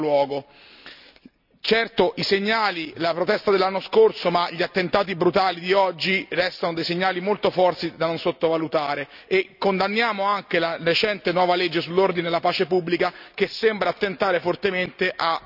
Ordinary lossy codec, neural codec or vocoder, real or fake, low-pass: none; none; real; 5.4 kHz